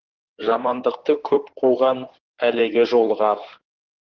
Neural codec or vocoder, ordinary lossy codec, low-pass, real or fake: codec, 16 kHz in and 24 kHz out, 2.2 kbps, FireRedTTS-2 codec; Opus, 16 kbps; 7.2 kHz; fake